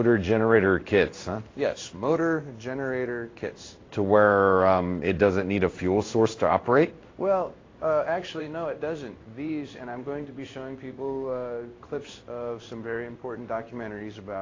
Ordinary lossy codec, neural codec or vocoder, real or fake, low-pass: AAC, 32 kbps; codec, 16 kHz in and 24 kHz out, 1 kbps, XY-Tokenizer; fake; 7.2 kHz